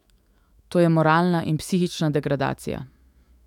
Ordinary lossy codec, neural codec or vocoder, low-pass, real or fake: none; autoencoder, 48 kHz, 128 numbers a frame, DAC-VAE, trained on Japanese speech; 19.8 kHz; fake